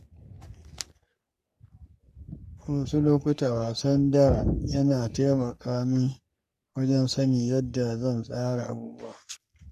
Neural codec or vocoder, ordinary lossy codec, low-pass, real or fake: codec, 44.1 kHz, 3.4 kbps, Pupu-Codec; none; 14.4 kHz; fake